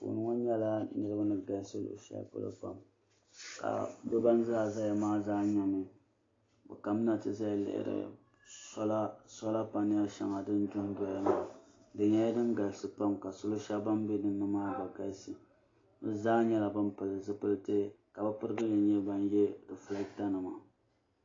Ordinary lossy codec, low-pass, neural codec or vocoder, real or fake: AAC, 32 kbps; 7.2 kHz; none; real